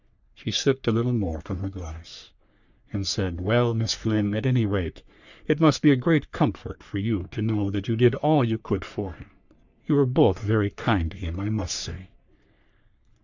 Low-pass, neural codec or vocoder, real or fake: 7.2 kHz; codec, 44.1 kHz, 3.4 kbps, Pupu-Codec; fake